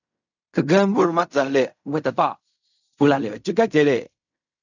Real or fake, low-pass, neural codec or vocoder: fake; 7.2 kHz; codec, 16 kHz in and 24 kHz out, 0.4 kbps, LongCat-Audio-Codec, fine tuned four codebook decoder